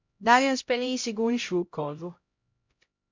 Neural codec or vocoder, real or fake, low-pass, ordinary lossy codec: codec, 16 kHz, 0.5 kbps, X-Codec, HuBERT features, trained on LibriSpeech; fake; 7.2 kHz; MP3, 64 kbps